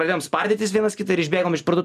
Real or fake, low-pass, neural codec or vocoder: real; 14.4 kHz; none